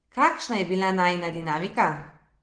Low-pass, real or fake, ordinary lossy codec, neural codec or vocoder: 9.9 kHz; real; Opus, 16 kbps; none